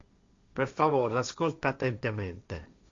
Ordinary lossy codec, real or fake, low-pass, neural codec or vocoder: Opus, 64 kbps; fake; 7.2 kHz; codec, 16 kHz, 1.1 kbps, Voila-Tokenizer